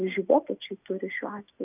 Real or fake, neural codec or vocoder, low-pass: real; none; 3.6 kHz